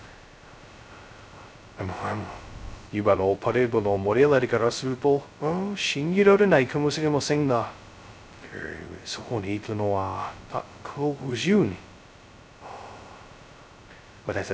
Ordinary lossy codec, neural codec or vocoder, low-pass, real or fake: none; codec, 16 kHz, 0.2 kbps, FocalCodec; none; fake